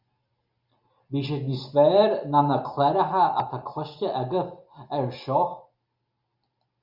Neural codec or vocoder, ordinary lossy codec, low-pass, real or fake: none; Opus, 64 kbps; 5.4 kHz; real